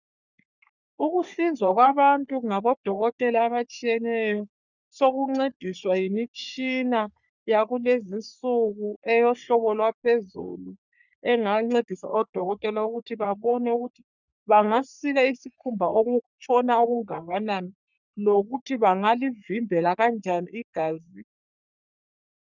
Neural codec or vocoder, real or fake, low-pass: codec, 44.1 kHz, 3.4 kbps, Pupu-Codec; fake; 7.2 kHz